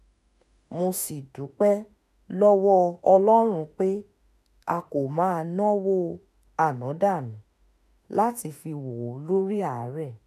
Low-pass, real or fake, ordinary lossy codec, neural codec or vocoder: 14.4 kHz; fake; none; autoencoder, 48 kHz, 32 numbers a frame, DAC-VAE, trained on Japanese speech